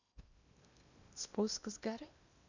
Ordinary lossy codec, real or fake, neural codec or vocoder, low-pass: none; fake; codec, 16 kHz in and 24 kHz out, 0.8 kbps, FocalCodec, streaming, 65536 codes; 7.2 kHz